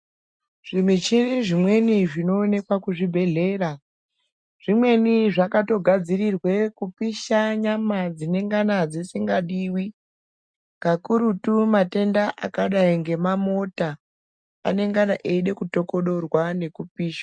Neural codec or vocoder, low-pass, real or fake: none; 9.9 kHz; real